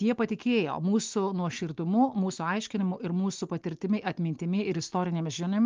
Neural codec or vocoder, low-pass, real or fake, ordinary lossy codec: none; 7.2 kHz; real; Opus, 32 kbps